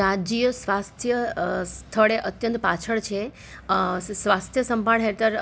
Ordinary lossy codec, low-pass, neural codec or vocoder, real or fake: none; none; none; real